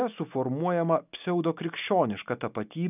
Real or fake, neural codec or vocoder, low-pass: real; none; 3.6 kHz